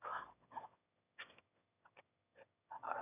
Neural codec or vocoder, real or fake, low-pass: autoencoder, 22.05 kHz, a latent of 192 numbers a frame, VITS, trained on one speaker; fake; 3.6 kHz